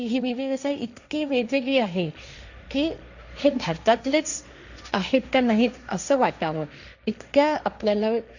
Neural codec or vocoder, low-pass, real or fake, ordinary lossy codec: codec, 16 kHz, 1.1 kbps, Voila-Tokenizer; 7.2 kHz; fake; none